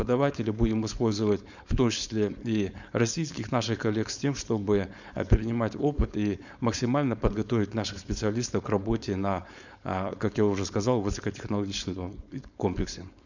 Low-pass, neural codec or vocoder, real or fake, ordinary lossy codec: 7.2 kHz; codec, 16 kHz, 4.8 kbps, FACodec; fake; none